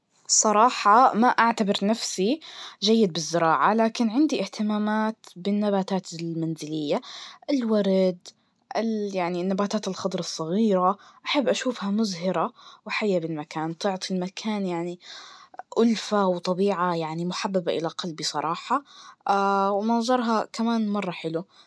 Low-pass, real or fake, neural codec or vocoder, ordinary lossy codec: none; real; none; none